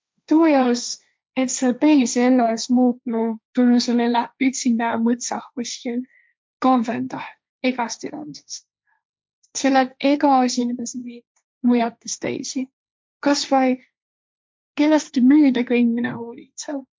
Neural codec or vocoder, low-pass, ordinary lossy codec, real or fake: codec, 16 kHz, 1.1 kbps, Voila-Tokenizer; none; none; fake